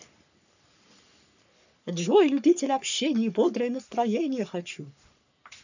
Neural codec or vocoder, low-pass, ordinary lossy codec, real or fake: codec, 44.1 kHz, 3.4 kbps, Pupu-Codec; 7.2 kHz; none; fake